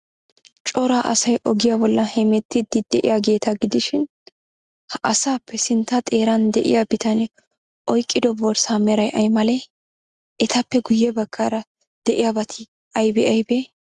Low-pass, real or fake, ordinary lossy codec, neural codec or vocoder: 10.8 kHz; real; Opus, 64 kbps; none